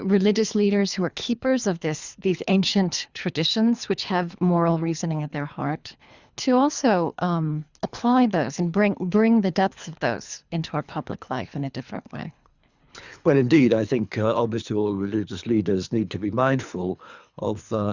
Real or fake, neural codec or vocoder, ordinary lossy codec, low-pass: fake; codec, 24 kHz, 3 kbps, HILCodec; Opus, 64 kbps; 7.2 kHz